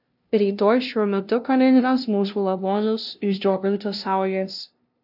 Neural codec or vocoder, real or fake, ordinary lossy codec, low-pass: codec, 16 kHz, 0.5 kbps, FunCodec, trained on LibriTTS, 25 frames a second; fake; AAC, 48 kbps; 5.4 kHz